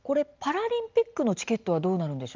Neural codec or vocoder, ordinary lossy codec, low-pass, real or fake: none; Opus, 24 kbps; 7.2 kHz; real